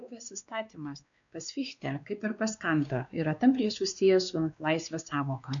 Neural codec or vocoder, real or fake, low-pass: codec, 16 kHz, 2 kbps, X-Codec, WavLM features, trained on Multilingual LibriSpeech; fake; 7.2 kHz